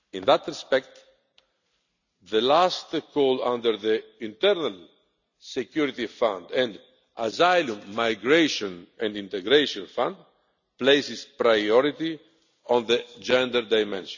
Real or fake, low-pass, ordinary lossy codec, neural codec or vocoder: real; 7.2 kHz; none; none